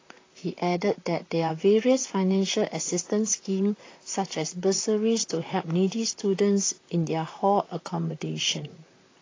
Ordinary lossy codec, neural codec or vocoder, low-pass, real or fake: AAC, 32 kbps; vocoder, 44.1 kHz, 128 mel bands, Pupu-Vocoder; 7.2 kHz; fake